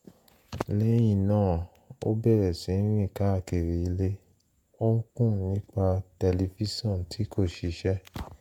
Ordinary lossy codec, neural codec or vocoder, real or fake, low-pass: MP3, 96 kbps; vocoder, 48 kHz, 128 mel bands, Vocos; fake; 19.8 kHz